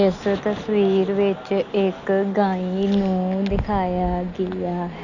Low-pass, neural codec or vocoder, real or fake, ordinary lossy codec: 7.2 kHz; none; real; none